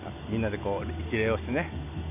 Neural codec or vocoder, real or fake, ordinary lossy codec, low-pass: none; real; none; 3.6 kHz